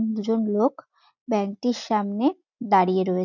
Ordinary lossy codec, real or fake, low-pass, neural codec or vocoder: none; real; 7.2 kHz; none